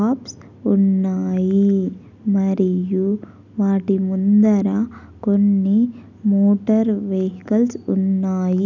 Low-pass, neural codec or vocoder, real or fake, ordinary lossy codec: 7.2 kHz; none; real; none